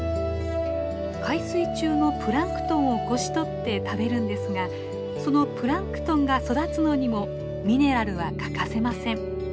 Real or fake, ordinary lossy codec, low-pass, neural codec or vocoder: real; none; none; none